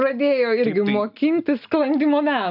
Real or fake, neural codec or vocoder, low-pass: real; none; 5.4 kHz